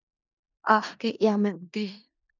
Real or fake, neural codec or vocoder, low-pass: fake; codec, 16 kHz in and 24 kHz out, 0.4 kbps, LongCat-Audio-Codec, four codebook decoder; 7.2 kHz